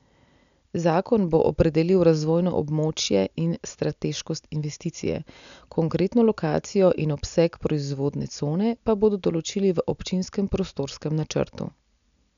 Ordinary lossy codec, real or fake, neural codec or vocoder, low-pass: none; real; none; 7.2 kHz